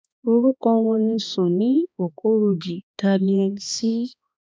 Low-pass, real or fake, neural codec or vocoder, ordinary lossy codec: none; fake; codec, 16 kHz, 2 kbps, X-Codec, HuBERT features, trained on balanced general audio; none